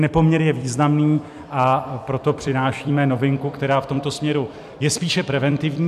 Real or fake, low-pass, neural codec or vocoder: fake; 14.4 kHz; vocoder, 44.1 kHz, 128 mel bands every 256 samples, BigVGAN v2